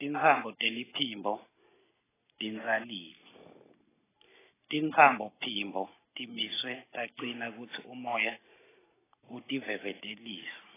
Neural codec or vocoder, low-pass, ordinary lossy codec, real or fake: none; 3.6 kHz; AAC, 16 kbps; real